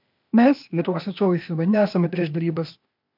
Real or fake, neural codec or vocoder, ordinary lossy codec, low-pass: fake; codec, 16 kHz, 0.8 kbps, ZipCodec; MP3, 48 kbps; 5.4 kHz